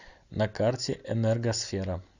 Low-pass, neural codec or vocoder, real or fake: 7.2 kHz; none; real